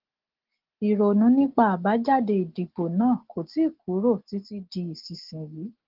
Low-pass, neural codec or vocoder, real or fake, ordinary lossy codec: 5.4 kHz; none; real; Opus, 16 kbps